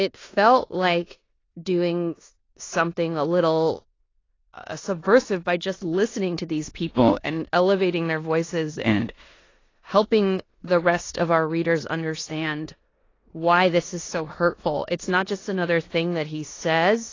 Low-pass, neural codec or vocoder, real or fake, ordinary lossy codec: 7.2 kHz; codec, 16 kHz in and 24 kHz out, 0.9 kbps, LongCat-Audio-Codec, four codebook decoder; fake; AAC, 32 kbps